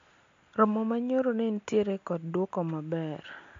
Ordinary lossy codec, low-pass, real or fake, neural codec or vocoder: AAC, 48 kbps; 7.2 kHz; real; none